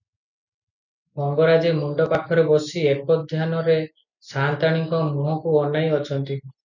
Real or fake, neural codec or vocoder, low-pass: real; none; 7.2 kHz